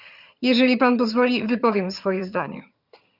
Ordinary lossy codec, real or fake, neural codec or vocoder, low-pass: Opus, 64 kbps; fake; vocoder, 22.05 kHz, 80 mel bands, HiFi-GAN; 5.4 kHz